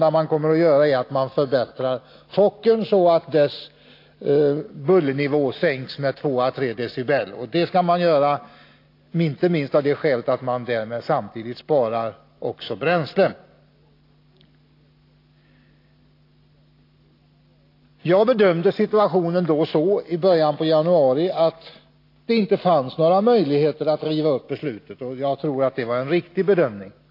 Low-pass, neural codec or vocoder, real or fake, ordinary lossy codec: 5.4 kHz; none; real; AAC, 32 kbps